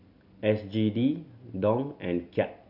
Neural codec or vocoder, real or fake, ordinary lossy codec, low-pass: none; real; none; 5.4 kHz